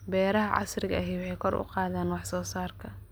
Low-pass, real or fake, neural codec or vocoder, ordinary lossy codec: none; real; none; none